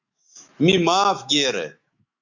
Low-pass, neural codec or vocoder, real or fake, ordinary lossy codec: 7.2 kHz; autoencoder, 48 kHz, 128 numbers a frame, DAC-VAE, trained on Japanese speech; fake; Opus, 64 kbps